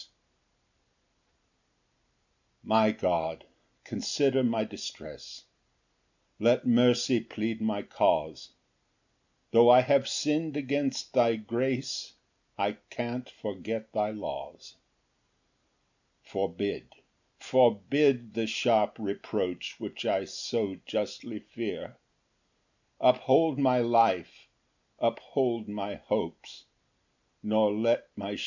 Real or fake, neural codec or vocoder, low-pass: real; none; 7.2 kHz